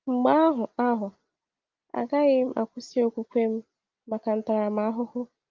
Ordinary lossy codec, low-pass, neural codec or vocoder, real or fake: Opus, 24 kbps; 7.2 kHz; none; real